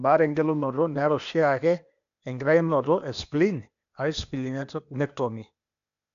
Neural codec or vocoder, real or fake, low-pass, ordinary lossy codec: codec, 16 kHz, 0.8 kbps, ZipCodec; fake; 7.2 kHz; none